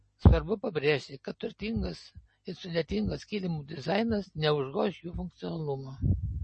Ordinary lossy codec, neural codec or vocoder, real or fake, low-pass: MP3, 32 kbps; none; real; 10.8 kHz